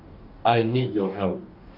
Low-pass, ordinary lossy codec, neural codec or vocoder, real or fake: 5.4 kHz; Opus, 24 kbps; codec, 44.1 kHz, 2.6 kbps, DAC; fake